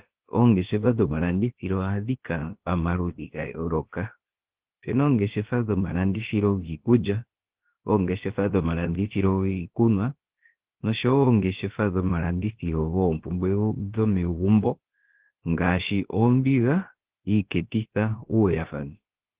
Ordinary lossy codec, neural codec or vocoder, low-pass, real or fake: Opus, 16 kbps; codec, 16 kHz, about 1 kbps, DyCAST, with the encoder's durations; 3.6 kHz; fake